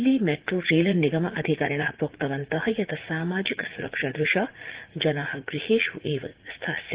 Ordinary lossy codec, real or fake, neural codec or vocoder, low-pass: Opus, 16 kbps; fake; vocoder, 44.1 kHz, 80 mel bands, Vocos; 3.6 kHz